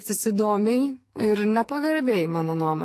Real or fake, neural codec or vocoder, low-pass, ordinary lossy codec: fake; codec, 44.1 kHz, 2.6 kbps, SNAC; 14.4 kHz; AAC, 64 kbps